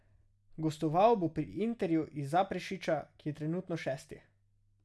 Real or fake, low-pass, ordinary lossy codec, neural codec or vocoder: real; none; none; none